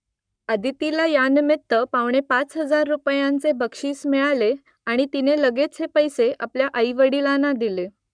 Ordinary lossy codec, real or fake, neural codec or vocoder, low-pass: none; fake; codec, 44.1 kHz, 7.8 kbps, Pupu-Codec; 9.9 kHz